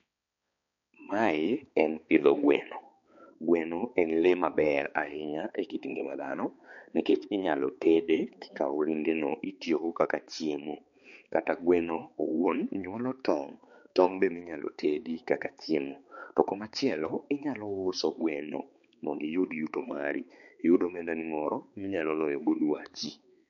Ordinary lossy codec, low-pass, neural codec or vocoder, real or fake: MP3, 48 kbps; 7.2 kHz; codec, 16 kHz, 4 kbps, X-Codec, HuBERT features, trained on balanced general audio; fake